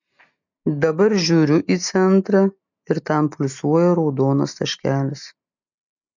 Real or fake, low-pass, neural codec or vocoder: real; 7.2 kHz; none